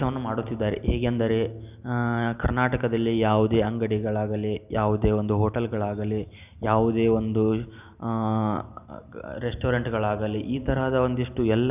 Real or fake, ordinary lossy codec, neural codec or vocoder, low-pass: real; none; none; 3.6 kHz